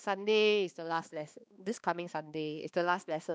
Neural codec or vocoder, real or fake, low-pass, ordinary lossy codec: codec, 16 kHz, 2 kbps, X-Codec, HuBERT features, trained on balanced general audio; fake; none; none